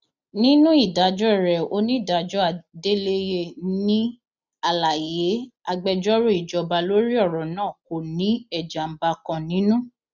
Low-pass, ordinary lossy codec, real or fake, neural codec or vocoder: 7.2 kHz; none; real; none